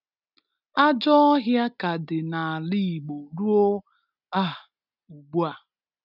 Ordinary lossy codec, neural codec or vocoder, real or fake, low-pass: none; none; real; 5.4 kHz